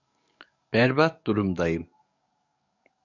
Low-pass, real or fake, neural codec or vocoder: 7.2 kHz; fake; codec, 44.1 kHz, 7.8 kbps, DAC